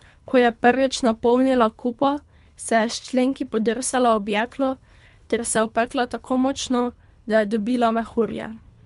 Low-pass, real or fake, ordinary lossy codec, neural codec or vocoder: 10.8 kHz; fake; MP3, 64 kbps; codec, 24 kHz, 3 kbps, HILCodec